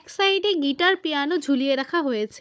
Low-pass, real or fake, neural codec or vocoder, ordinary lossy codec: none; fake; codec, 16 kHz, 16 kbps, FunCodec, trained on Chinese and English, 50 frames a second; none